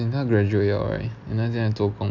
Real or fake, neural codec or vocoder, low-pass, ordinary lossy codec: real; none; 7.2 kHz; none